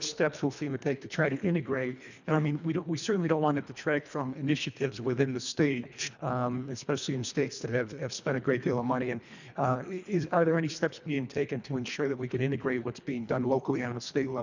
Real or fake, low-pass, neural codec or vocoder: fake; 7.2 kHz; codec, 24 kHz, 1.5 kbps, HILCodec